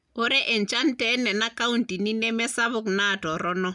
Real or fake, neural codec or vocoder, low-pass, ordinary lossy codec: real; none; 10.8 kHz; none